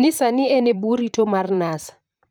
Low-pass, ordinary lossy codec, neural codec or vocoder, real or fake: none; none; vocoder, 44.1 kHz, 128 mel bands, Pupu-Vocoder; fake